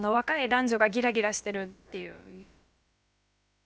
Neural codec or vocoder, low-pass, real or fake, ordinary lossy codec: codec, 16 kHz, about 1 kbps, DyCAST, with the encoder's durations; none; fake; none